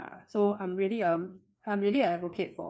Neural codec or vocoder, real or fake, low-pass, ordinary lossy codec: codec, 16 kHz, 2 kbps, FreqCodec, larger model; fake; none; none